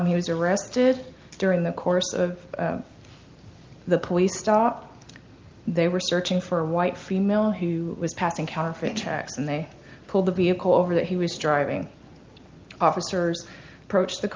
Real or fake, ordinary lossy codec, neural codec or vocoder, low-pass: real; Opus, 32 kbps; none; 7.2 kHz